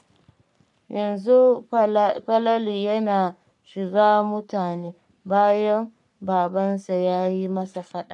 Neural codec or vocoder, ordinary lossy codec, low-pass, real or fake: codec, 44.1 kHz, 3.4 kbps, Pupu-Codec; none; 10.8 kHz; fake